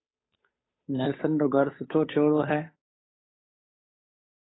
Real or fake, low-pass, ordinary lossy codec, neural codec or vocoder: fake; 7.2 kHz; AAC, 16 kbps; codec, 16 kHz, 8 kbps, FunCodec, trained on Chinese and English, 25 frames a second